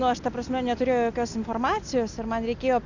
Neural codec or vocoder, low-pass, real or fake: none; 7.2 kHz; real